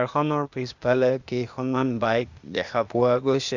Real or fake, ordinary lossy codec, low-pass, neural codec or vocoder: fake; Opus, 64 kbps; 7.2 kHz; codec, 16 kHz, 0.8 kbps, ZipCodec